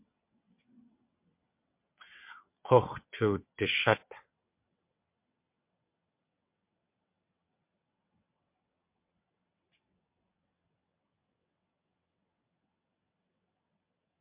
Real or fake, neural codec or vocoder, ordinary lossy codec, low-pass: fake; vocoder, 22.05 kHz, 80 mel bands, WaveNeXt; MP3, 32 kbps; 3.6 kHz